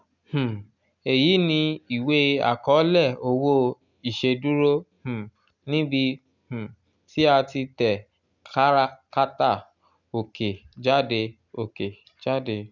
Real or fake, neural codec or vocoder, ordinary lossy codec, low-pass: real; none; none; 7.2 kHz